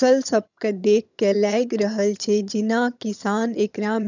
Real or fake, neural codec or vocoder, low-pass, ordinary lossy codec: fake; vocoder, 22.05 kHz, 80 mel bands, HiFi-GAN; 7.2 kHz; none